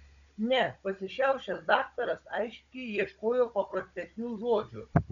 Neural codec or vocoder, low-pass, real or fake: codec, 16 kHz, 16 kbps, FunCodec, trained on Chinese and English, 50 frames a second; 7.2 kHz; fake